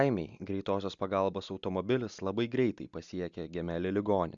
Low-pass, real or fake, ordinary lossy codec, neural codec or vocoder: 7.2 kHz; real; Opus, 64 kbps; none